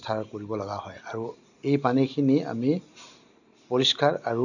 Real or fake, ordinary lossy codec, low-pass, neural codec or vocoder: real; none; 7.2 kHz; none